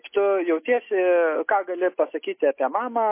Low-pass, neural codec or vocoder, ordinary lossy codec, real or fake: 3.6 kHz; none; MP3, 24 kbps; real